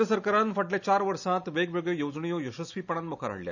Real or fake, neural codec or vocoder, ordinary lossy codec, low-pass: real; none; none; 7.2 kHz